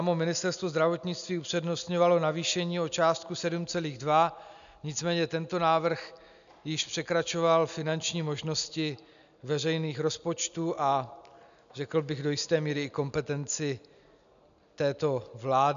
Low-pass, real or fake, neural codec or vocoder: 7.2 kHz; real; none